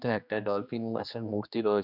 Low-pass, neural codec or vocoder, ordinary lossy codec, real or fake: 5.4 kHz; codec, 16 kHz, 2 kbps, X-Codec, HuBERT features, trained on general audio; none; fake